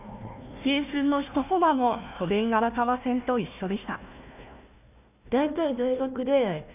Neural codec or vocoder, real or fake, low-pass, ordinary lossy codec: codec, 16 kHz, 1 kbps, FunCodec, trained on Chinese and English, 50 frames a second; fake; 3.6 kHz; none